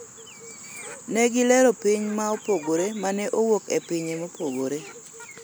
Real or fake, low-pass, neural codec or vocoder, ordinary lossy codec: real; none; none; none